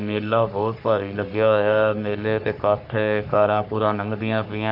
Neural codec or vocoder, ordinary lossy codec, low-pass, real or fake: codec, 44.1 kHz, 3.4 kbps, Pupu-Codec; none; 5.4 kHz; fake